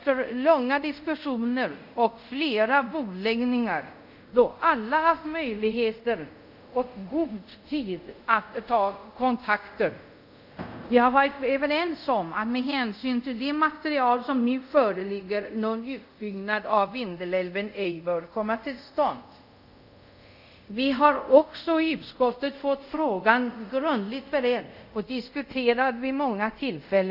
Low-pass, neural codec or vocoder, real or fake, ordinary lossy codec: 5.4 kHz; codec, 24 kHz, 0.5 kbps, DualCodec; fake; none